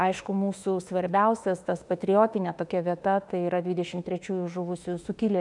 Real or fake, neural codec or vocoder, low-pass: fake; autoencoder, 48 kHz, 32 numbers a frame, DAC-VAE, trained on Japanese speech; 10.8 kHz